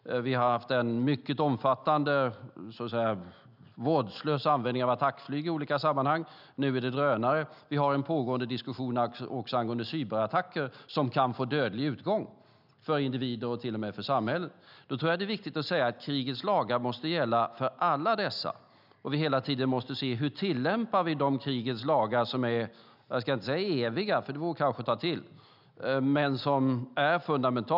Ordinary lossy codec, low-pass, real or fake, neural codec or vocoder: none; 5.4 kHz; real; none